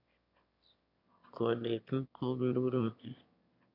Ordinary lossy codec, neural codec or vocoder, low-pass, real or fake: none; autoencoder, 22.05 kHz, a latent of 192 numbers a frame, VITS, trained on one speaker; 5.4 kHz; fake